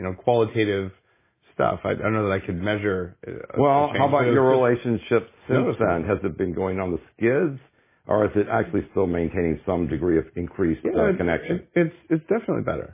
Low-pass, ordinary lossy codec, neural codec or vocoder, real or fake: 3.6 kHz; MP3, 16 kbps; none; real